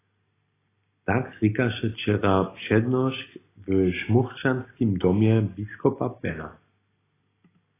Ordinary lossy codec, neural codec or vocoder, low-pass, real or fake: AAC, 16 kbps; none; 3.6 kHz; real